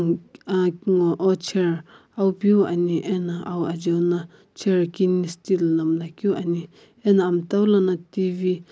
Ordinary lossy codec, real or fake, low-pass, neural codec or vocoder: none; real; none; none